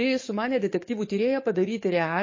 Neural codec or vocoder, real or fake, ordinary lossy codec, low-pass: codec, 44.1 kHz, 7.8 kbps, DAC; fake; MP3, 32 kbps; 7.2 kHz